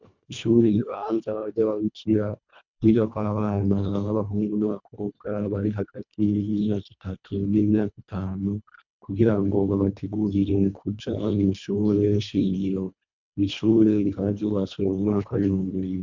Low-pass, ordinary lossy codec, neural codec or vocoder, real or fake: 7.2 kHz; MP3, 64 kbps; codec, 24 kHz, 1.5 kbps, HILCodec; fake